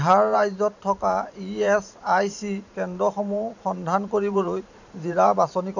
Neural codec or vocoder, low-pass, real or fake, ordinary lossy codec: vocoder, 44.1 kHz, 128 mel bands every 512 samples, BigVGAN v2; 7.2 kHz; fake; none